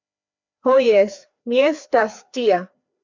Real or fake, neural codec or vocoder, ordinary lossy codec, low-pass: fake; codec, 16 kHz, 2 kbps, FreqCodec, larger model; MP3, 64 kbps; 7.2 kHz